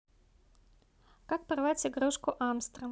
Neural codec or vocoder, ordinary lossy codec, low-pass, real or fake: none; none; none; real